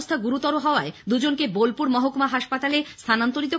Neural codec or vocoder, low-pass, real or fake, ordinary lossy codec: none; none; real; none